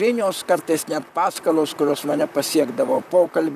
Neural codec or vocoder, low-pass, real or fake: vocoder, 44.1 kHz, 128 mel bands, Pupu-Vocoder; 14.4 kHz; fake